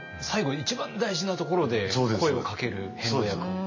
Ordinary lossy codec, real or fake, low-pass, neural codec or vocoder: MP3, 32 kbps; real; 7.2 kHz; none